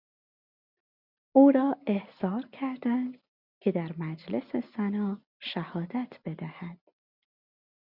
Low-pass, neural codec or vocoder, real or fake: 5.4 kHz; none; real